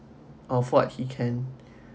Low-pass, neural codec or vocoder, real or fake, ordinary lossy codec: none; none; real; none